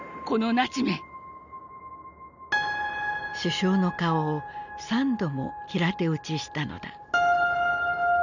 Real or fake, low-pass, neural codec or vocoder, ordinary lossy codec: real; 7.2 kHz; none; none